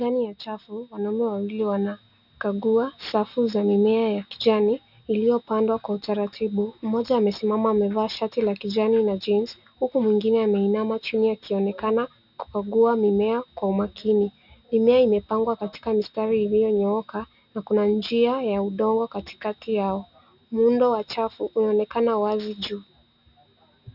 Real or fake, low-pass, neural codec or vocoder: real; 5.4 kHz; none